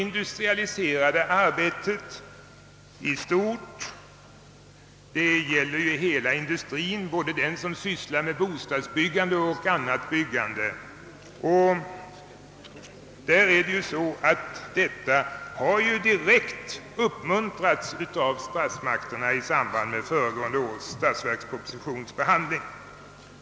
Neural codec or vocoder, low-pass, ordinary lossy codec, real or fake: none; none; none; real